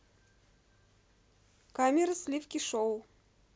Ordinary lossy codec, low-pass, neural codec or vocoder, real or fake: none; none; none; real